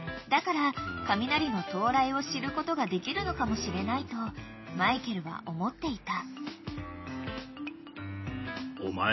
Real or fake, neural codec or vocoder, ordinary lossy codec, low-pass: real; none; MP3, 24 kbps; 7.2 kHz